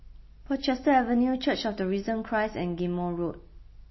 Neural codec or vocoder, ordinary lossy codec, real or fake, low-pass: none; MP3, 24 kbps; real; 7.2 kHz